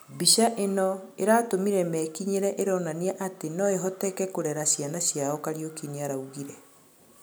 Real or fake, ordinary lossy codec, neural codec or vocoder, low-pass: real; none; none; none